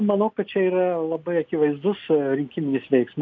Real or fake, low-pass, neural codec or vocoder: real; 7.2 kHz; none